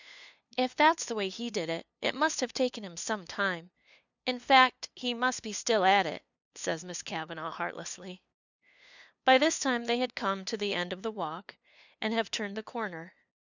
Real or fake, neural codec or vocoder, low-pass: fake; codec, 16 kHz, 2 kbps, FunCodec, trained on LibriTTS, 25 frames a second; 7.2 kHz